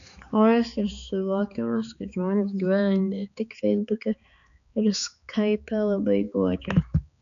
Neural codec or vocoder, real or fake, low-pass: codec, 16 kHz, 4 kbps, X-Codec, HuBERT features, trained on balanced general audio; fake; 7.2 kHz